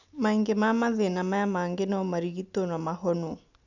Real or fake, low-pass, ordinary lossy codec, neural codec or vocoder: real; 7.2 kHz; none; none